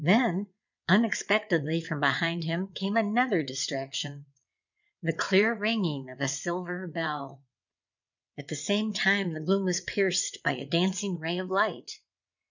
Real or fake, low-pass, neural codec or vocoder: fake; 7.2 kHz; vocoder, 22.05 kHz, 80 mel bands, WaveNeXt